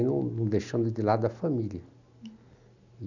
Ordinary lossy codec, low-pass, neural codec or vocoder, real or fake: none; 7.2 kHz; none; real